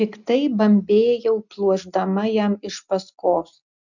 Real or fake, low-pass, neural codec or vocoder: real; 7.2 kHz; none